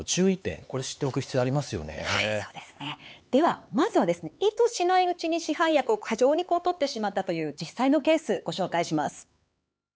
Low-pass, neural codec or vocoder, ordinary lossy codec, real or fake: none; codec, 16 kHz, 4 kbps, X-Codec, HuBERT features, trained on LibriSpeech; none; fake